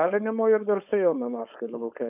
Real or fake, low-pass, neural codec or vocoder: fake; 3.6 kHz; codec, 16 kHz, 4.8 kbps, FACodec